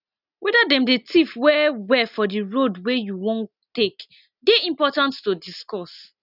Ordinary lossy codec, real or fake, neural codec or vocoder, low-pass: none; real; none; 5.4 kHz